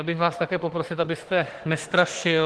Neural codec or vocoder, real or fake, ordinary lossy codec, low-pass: autoencoder, 48 kHz, 32 numbers a frame, DAC-VAE, trained on Japanese speech; fake; Opus, 16 kbps; 10.8 kHz